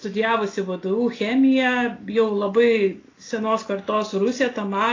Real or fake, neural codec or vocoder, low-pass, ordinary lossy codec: real; none; 7.2 kHz; AAC, 32 kbps